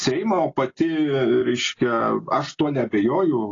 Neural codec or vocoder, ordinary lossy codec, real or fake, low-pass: none; AAC, 32 kbps; real; 7.2 kHz